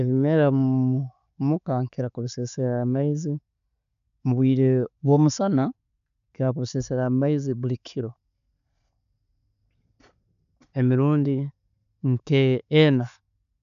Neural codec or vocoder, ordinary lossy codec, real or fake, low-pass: none; none; real; 7.2 kHz